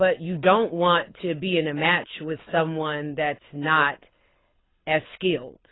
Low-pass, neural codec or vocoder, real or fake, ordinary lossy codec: 7.2 kHz; codec, 24 kHz, 6 kbps, HILCodec; fake; AAC, 16 kbps